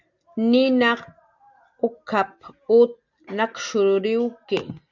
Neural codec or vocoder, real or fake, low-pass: none; real; 7.2 kHz